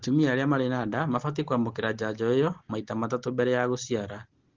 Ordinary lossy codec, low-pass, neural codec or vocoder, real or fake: Opus, 16 kbps; 7.2 kHz; none; real